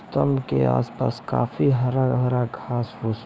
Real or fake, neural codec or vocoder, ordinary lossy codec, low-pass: fake; codec, 16 kHz, 6 kbps, DAC; none; none